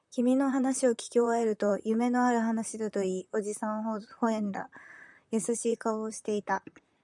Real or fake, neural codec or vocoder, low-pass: fake; vocoder, 44.1 kHz, 128 mel bands, Pupu-Vocoder; 10.8 kHz